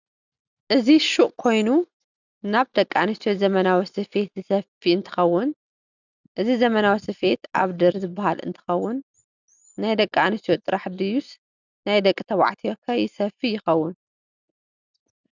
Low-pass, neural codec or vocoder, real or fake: 7.2 kHz; none; real